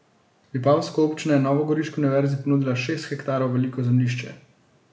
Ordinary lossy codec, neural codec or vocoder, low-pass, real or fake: none; none; none; real